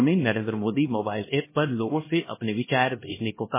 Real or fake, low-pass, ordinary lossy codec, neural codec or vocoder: fake; 3.6 kHz; MP3, 16 kbps; codec, 16 kHz, 1 kbps, X-Codec, HuBERT features, trained on LibriSpeech